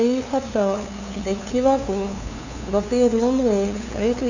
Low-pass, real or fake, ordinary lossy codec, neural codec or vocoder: 7.2 kHz; fake; none; codec, 16 kHz, 2 kbps, FunCodec, trained on LibriTTS, 25 frames a second